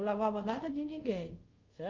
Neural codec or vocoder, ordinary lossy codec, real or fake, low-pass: codec, 24 kHz, 0.5 kbps, DualCodec; Opus, 32 kbps; fake; 7.2 kHz